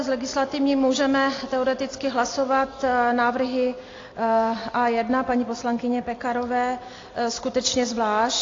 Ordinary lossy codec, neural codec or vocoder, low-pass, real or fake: AAC, 32 kbps; none; 7.2 kHz; real